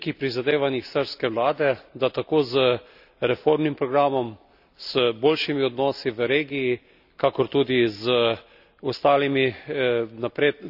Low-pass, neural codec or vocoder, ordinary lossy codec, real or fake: 5.4 kHz; none; none; real